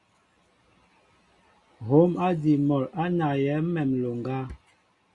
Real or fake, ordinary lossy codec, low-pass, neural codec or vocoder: real; Opus, 64 kbps; 10.8 kHz; none